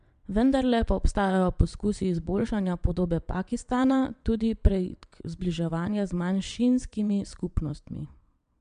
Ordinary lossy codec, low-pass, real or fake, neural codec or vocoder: MP3, 64 kbps; 9.9 kHz; fake; vocoder, 22.05 kHz, 80 mel bands, WaveNeXt